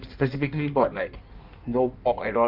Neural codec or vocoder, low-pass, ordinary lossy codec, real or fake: codec, 16 kHz in and 24 kHz out, 1.1 kbps, FireRedTTS-2 codec; 5.4 kHz; Opus, 24 kbps; fake